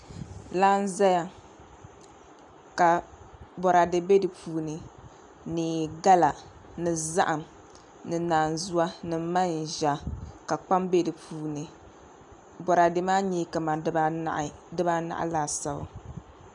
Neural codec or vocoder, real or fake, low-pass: none; real; 10.8 kHz